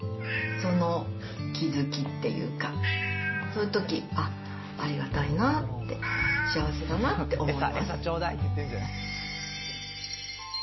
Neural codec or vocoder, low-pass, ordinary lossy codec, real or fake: none; 7.2 kHz; MP3, 24 kbps; real